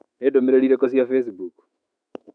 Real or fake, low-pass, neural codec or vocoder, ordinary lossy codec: fake; 9.9 kHz; autoencoder, 48 kHz, 128 numbers a frame, DAC-VAE, trained on Japanese speech; none